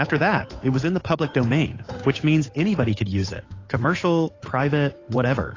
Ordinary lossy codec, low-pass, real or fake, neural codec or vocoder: AAC, 32 kbps; 7.2 kHz; fake; codec, 16 kHz, 8 kbps, FunCodec, trained on Chinese and English, 25 frames a second